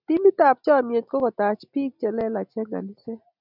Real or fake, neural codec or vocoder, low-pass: real; none; 5.4 kHz